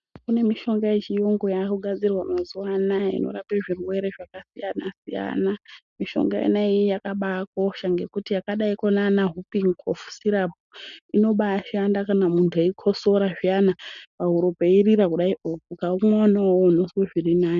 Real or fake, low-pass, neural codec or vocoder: real; 7.2 kHz; none